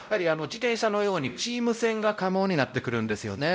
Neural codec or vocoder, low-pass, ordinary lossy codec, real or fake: codec, 16 kHz, 0.5 kbps, X-Codec, WavLM features, trained on Multilingual LibriSpeech; none; none; fake